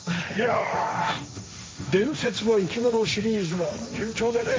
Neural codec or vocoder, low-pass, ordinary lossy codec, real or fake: codec, 16 kHz, 1.1 kbps, Voila-Tokenizer; none; none; fake